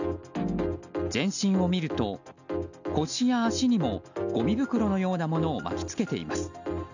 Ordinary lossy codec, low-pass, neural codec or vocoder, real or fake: none; 7.2 kHz; none; real